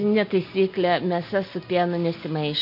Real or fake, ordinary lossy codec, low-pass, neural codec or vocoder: real; MP3, 32 kbps; 5.4 kHz; none